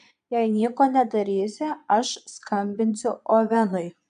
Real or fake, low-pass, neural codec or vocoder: fake; 9.9 kHz; vocoder, 22.05 kHz, 80 mel bands, Vocos